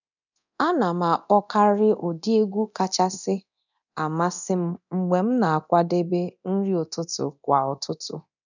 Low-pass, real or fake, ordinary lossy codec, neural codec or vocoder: 7.2 kHz; fake; none; codec, 24 kHz, 0.9 kbps, DualCodec